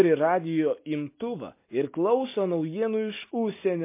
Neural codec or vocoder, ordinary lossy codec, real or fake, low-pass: none; MP3, 24 kbps; real; 3.6 kHz